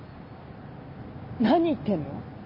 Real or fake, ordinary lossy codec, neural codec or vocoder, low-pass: real; MP3, 24 kbps; none; 5.4 kHz